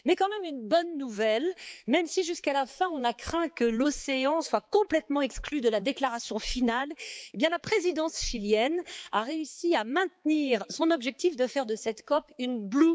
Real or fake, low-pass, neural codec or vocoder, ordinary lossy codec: fake; none; codec, 16 kHz, 4 kbps, X-Codec, HuBERT features, trained on balanced general audio; none